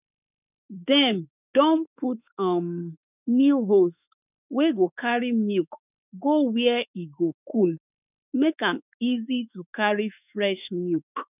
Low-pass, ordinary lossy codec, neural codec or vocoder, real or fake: 3.6 kHz; none; autoencoder, 48 kHz, 32 numbers a frame, DAC-VAE, trained on Japanese speech; fake